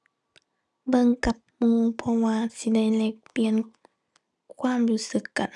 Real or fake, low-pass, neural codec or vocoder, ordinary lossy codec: real; none; none; none